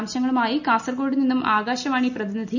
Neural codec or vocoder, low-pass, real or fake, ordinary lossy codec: none; 7.2 kHz; real; none